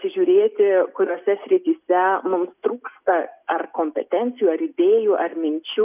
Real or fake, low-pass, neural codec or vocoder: real; 3.6 kHz; none